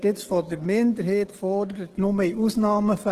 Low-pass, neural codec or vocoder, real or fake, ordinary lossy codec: 14.4 kHz; codec, 44.1 kHz, 7.8 kbps, DAC; fake; Opus, 16 kbps